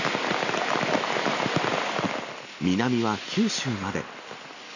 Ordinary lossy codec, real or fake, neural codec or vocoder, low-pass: none; real; none; 7.2 kHz